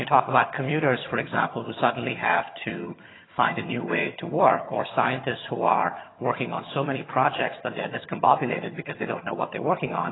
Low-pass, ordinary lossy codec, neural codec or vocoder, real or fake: 7.2 kHz; AAC, 16 kbps; vocoder, 22.05 kHz, 80 mel bands, HiFi-GAN; fake